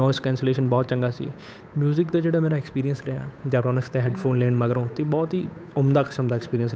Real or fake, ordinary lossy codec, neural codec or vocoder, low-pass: fake; none; codec, 16 kHz, 8 kbps, FunCodec, trained on Chinese and English, 25 frames a second; none